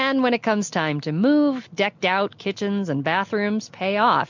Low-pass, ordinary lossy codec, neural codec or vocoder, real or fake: 7.2 kHz; MP3, 64 kbps; none; real